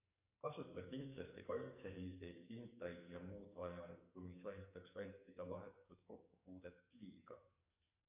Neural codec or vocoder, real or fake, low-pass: codec, 44.1 kHz, 2.6 kbps, SNAC; fake; 3.6 kHz